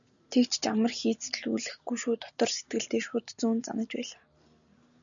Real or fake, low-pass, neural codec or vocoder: real; 7.2 kHz; none